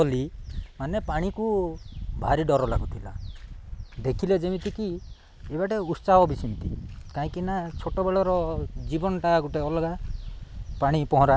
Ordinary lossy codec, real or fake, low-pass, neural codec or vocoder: none; real; none; none